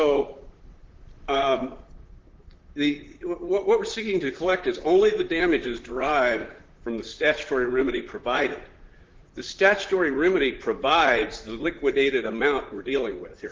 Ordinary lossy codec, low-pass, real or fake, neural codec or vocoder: Opus, 16 kbps; 7.2 kHz; fake; vocoder, 44.1 kHz, 128 mel bands, Pupu-Vocoder